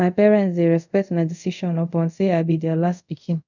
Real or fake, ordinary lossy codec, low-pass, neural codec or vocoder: fake; none; 7.2 kHz; codec, 24 kHz, 0.5 kbps, DualCodec